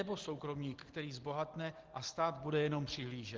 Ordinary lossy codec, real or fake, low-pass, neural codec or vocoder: Opus, 16 kbps; real; 7.2 kHz; none